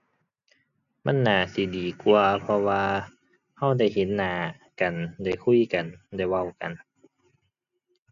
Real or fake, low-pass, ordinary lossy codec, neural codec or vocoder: real; 7.2 kHz; none; none